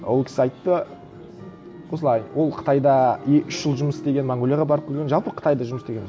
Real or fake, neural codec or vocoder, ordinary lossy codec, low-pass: real; none; none; none